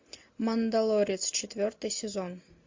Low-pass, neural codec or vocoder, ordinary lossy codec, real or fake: 7.2 kHz; none; MP3, 48 kbps; real